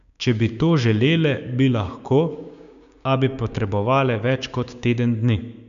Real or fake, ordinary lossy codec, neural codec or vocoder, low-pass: fake; none; codec, 16 kHz, 6 kbps, DAC; 7.2 kHz